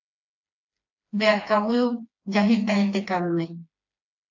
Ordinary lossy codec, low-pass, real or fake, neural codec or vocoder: AAC, 48 kbps; 7.2 kHz; fake; codec, 16 kHz, 2 kbps, FreqCodec, smaller model